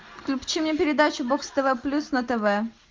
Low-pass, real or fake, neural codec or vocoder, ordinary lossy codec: 7.2 kHz; real; none; Opus, 32 kbps